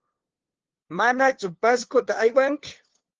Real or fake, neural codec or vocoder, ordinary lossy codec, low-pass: fake; codec, 16 kHz, 2 kbps, FunCodec, trained on LibriTTS, 25 frames a second; Opus, 16 kbps; 7.2 kHz